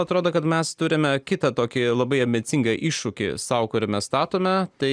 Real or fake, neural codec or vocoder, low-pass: real; none; 9.9 kHz